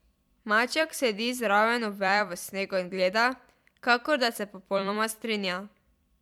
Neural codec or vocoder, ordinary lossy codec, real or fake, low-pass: vocoder, 44.1 kHz, 128 mel bands every 256 samples, BigVGAN v2; MP3, 96 kbps; fake; 19.8 kHz